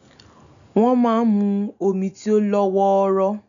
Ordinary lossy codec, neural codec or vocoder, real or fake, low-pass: none; none; real; 7.2 kHz